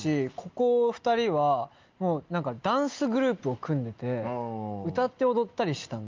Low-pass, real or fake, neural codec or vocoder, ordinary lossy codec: 7.2 kHz; real; none; Opus, 24 kbps